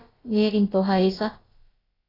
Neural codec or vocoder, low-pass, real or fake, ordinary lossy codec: codec, 16 kHz, about 1 kbps, DyCAST, with the encoder's durations; 5.4 kHz; fake; AAC, 24 kbps